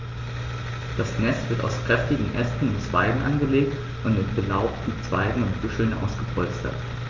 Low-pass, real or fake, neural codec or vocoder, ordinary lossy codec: 7.2 kHz; real; none; Opus, 32 kbps